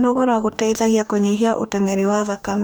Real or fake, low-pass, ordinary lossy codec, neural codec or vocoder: fake; none; none; codec, 44.1 kHz, 2.6 kbps, DAC